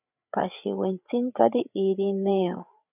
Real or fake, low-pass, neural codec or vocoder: fake; 3.6 kHz; codec, 16 kHz, 8 kbps, FreqCodec, larger model